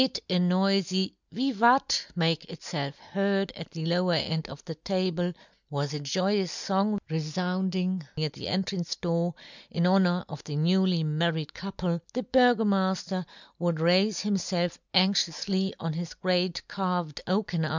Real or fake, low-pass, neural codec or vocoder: real; 7.2 kHz; none